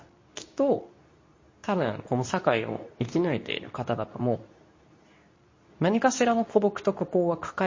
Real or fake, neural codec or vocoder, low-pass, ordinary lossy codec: fake; codec, 24 kHz, 0.9 kbps, WavTokenizer, medium speech release version 2; 7.2 kHz; MP3, 32 kbps